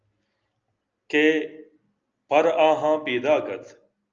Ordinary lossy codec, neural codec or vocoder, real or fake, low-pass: Opus, 24 kbps; none; real; 7.2 kHz